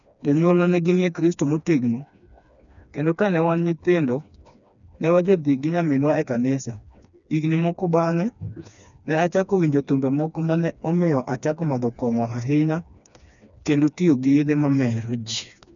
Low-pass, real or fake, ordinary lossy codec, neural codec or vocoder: 7.2 kHz; fake; none; codec, 16 kHz, 2 kbps, FreqCodec, smaller model